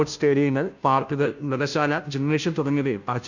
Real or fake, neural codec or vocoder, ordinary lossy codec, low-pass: fake; codec, 16 kHz, 0.5 kbps, FunCodec, trained on Chinese and English, 25 frames a second; none; 7.2 kHz